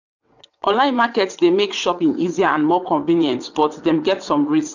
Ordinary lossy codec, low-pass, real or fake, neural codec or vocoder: none; 7.2 kHz; fake; vocoder, 22.05 kHz, 80 mel bands, WaveNeXt